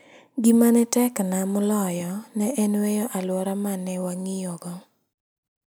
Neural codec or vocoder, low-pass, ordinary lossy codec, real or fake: none; none; none; real